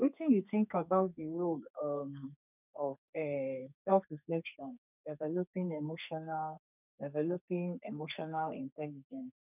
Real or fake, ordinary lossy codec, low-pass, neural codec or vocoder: fake; none; 3.6 kHz; codec, 32 kHz, 1.9 kbps, SNAC